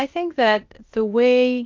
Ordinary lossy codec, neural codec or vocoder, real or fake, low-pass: Opus, 32 kbps; codec, 16 kHz, 0.8 kbps, ZipCodec; fake; 7.2 kHz